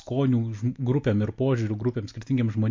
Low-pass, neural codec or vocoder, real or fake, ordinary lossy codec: 7.2 kHz; none; real; MP3, 48 kbps